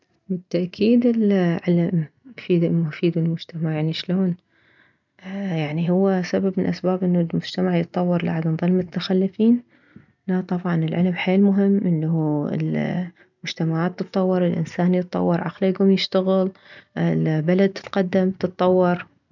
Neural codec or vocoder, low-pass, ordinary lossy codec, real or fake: none; 7.2 kHz; none; real